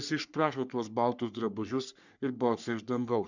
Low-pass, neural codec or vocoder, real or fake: 7.2 kHz; codec, 44.1 kHz, 3.4 kbps, Pupu-Codec; fake